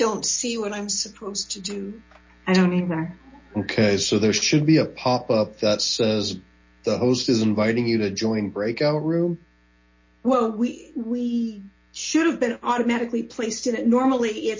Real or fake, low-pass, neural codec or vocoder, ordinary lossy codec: real; 7.2 kHz; none; MP3, 32 kbps